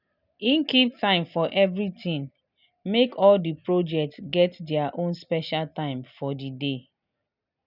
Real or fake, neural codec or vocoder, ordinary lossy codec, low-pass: real; none; none; 5.4 kHz